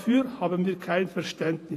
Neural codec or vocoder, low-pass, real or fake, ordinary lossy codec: vocoder, 48 kHz, 128 mel bands, Vocos; 14.4 kHz; fake; AAC, 48 kbps